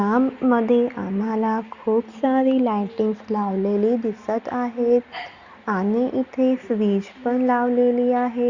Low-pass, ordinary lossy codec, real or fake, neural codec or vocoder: 7.2 kHz; Opus, 64 kbps; real; none